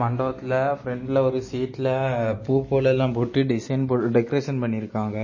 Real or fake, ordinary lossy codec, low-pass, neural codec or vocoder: real; MP3, 32 kbps; 7.2 kHz; none